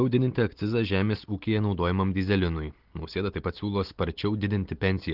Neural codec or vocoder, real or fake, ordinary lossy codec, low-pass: none; real; Opus, 16 kbps; 5.4 kHz